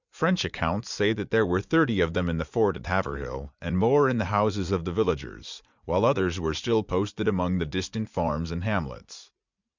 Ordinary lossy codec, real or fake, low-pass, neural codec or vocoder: Opus, 64 kbps; fake; 7.2 kHz; vocoder, 44.1 kHz, 80 mel bands, Vocos